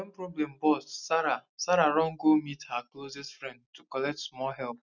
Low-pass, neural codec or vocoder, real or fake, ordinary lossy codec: 7.2 kHz; none; real; none